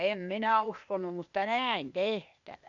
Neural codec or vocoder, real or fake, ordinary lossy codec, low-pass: codec, 16 kHz, 0.8 kbps, ZipCodec; fake; none; 7.2 kHz